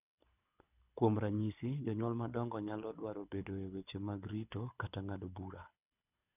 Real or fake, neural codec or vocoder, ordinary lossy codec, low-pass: fake; codec, 24 kHz, 6 kbps, HILCodec; none; 3.6 kHz